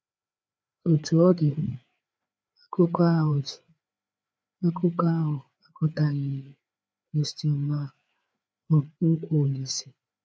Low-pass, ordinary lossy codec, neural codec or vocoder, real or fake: none; none; codec, 16 kHz, 4 kbps, FreqCodec, larger model; fake